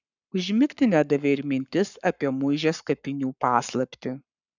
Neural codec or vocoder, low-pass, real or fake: codec, 44.1 kHz, 7.8 kbps, Pupu-Codec; 7.2 kHz; fake